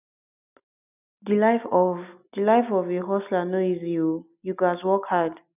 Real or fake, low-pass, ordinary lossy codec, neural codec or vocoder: real; 3.6 kHz; none; none